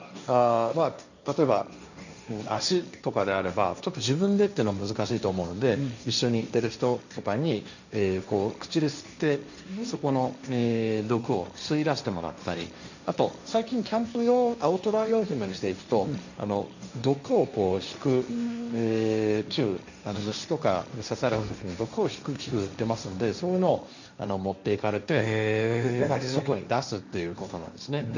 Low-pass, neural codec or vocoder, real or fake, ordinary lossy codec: 7.2 kHz; codec, 16 kHz, 1.1 kbps, Voila-Tokenizer; fake; none